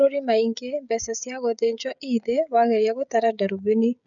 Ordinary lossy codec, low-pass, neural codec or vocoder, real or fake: none; 7.2 kHz; codec, 16 kHz, 16 kbps, FreqCodec, smaller model; fake